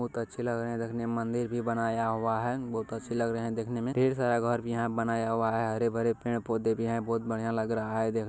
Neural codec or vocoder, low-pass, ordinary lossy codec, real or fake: none; none; none; real